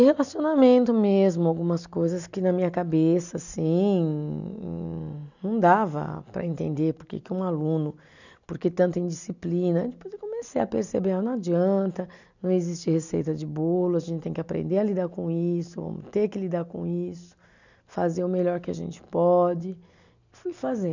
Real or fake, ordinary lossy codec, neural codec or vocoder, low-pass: real; none; none; 7.2 kHz